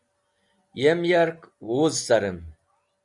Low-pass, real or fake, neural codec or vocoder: 10.8 kHz; real; none